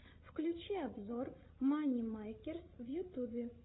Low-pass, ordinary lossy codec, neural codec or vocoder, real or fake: 7.2 kHz; AAC, 16 kbps; codec, 16 kHz, 16 kbps, FreqCodec, larger model; fake